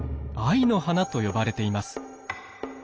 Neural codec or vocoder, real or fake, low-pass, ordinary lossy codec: none; real; none; none